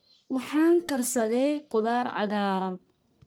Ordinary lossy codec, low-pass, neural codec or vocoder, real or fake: none; none; codec, 44.1 kHz, 1.7 kbps, Pupu-Codec; fake